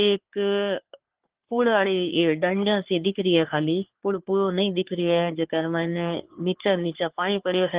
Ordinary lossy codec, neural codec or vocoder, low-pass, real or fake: Opus, 16 kbps; codec, 44.1 kHz, 3.4 kbps, Pupu-Codec; 3.6 kHz; fake